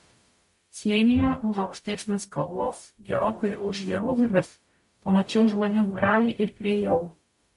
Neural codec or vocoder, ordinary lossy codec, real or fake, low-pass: codec, 44.1 kHz, 0.9 kbps, DAC; MP3, 48 kbps; fake; 14.4 kHz